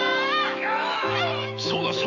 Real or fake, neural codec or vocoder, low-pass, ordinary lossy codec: fake; autoencoder, 48 kHz, 128 numbers a frame, DAC-VAE, trained on Japanese speech; 7.2 kHz; none